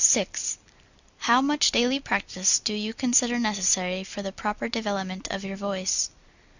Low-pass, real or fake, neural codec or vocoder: 7.2 kHz; real; none